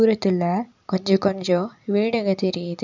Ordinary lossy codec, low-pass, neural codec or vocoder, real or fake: none; 7.2 kHz; none; real